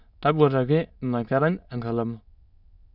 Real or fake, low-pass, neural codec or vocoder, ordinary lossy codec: fake; 5.4 kHz; autoencoder, 22.05 kHz, a latent of 192 numbers a frame, VITS, trained on many speakers; none